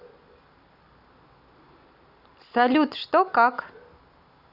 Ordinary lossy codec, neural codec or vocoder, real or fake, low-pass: none; none; real; 5.4 kHz